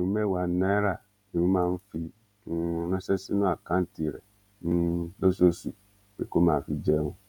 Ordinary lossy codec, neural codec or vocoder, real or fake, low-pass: none; vocoder, 48 kHz, 128 mel bands, Vocos; fake; 19.8 kHz